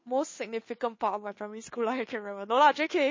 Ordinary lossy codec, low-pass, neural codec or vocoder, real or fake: MP3, 32 kbps; 7.2 kHz; codec, 16 kHz, 2 kbps, FunCodec, trained on Chinese and English, 25 frames a second; fake